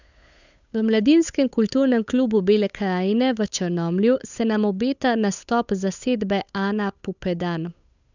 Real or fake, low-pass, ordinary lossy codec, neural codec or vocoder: fake; 7.2 kHz; none; codec, 16 kHz, 8 kbps, FunCodec, trained on Chinese and English, 25 frames a second